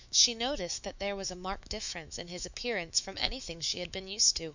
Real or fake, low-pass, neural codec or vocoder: fake; 7.2 kHz; autoencoder, 48 kHz, 128 numbers a frame, DAC-VAE, trained on Japanese speech